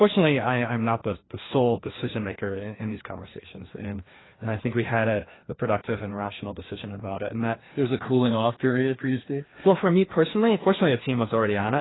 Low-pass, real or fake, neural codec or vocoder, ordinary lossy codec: 7.2 kHz; fake; codec, 16 kHz, 2 kbps, FreqCodec, larger model; AAC, 16 kbps